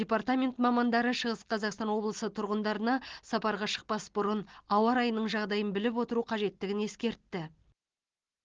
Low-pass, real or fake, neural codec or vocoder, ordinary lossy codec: 7.2 kHz; real; none; Opus, 16 kbps